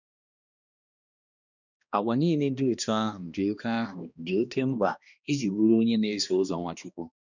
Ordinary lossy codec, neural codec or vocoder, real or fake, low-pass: none; codec, 16 kHz, 1 kbps, X-Codec, HuBERT features, trained on balanced general audio; fake; 7.2 kHz